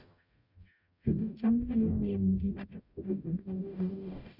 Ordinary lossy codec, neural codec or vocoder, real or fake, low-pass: none; codec, 44.1 kHz, 0.9 kbps, DAC; fake; 5.4 kHz